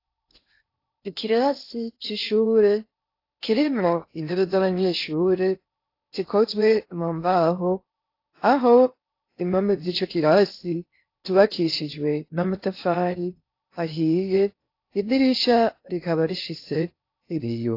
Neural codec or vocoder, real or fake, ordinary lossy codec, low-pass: codec, 16 kHz in and 24 kHz out, 0.6 kbps, FocalCodec, streaming, 4096 codes; fake; AAC, 32 kbps; 5.4 kHz